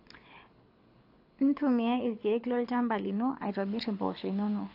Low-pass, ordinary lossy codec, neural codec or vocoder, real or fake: 5.4 kHz; AAC, 32 kbps; codec, 24 kHz, 6 kbps, HILCodec; fake